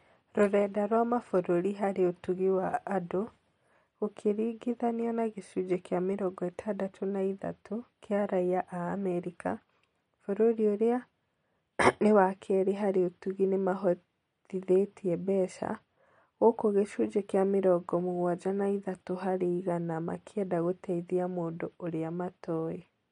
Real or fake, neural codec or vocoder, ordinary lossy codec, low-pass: real; none; MP3, 48 kbps; 19.8 kHz